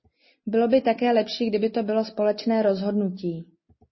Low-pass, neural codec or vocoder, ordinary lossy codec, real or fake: 7.2 kHz; none; MP3, 24 kbps; real